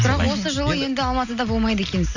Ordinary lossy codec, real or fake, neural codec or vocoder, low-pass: none; real; none; 7.2 kHz